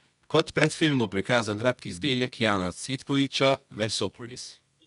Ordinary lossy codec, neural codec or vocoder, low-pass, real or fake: none; codec, 24 kHz, 0.9 kbps, WavTokenizer, medium music audio release; 10.8 kHz; fake